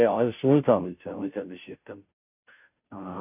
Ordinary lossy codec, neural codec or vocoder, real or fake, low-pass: none; codec, 16 kHz, 0.5 kbps, FunCodec, trained on Chinese and English, 25 frames a second; fake; 3.6 kHz